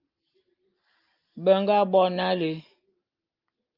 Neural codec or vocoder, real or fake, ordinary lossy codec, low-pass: none; real; Opus, 24 kbps; 5.4 kHz